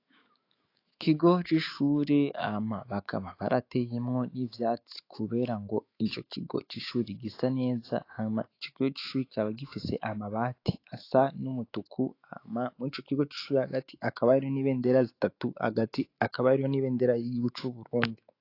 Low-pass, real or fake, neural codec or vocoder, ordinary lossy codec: 5.4 kHz; fake; autoencoder, 48 kHz, 128 numbers a frame, DAC-VAE, trained on Japanese speech; AAC, 32 kbps